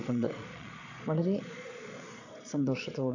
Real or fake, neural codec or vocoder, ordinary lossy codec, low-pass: fake; vocoder, 22.05 kHz, 80 mel bands, Vocos; none; 7.2 kHz